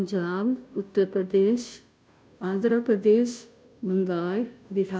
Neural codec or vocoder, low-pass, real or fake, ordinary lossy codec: codec, 16 kHz, 0.5 kbps, FunCodec, trained on Chinese and English, 25 frames a second; none; fake; none